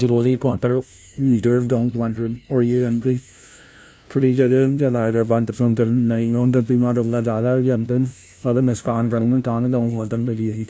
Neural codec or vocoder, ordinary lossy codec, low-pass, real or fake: codec, 16 kHz, 0.5 kbps, FunCodec, trained on LibriTTS, 25 frames a second; none; none; fake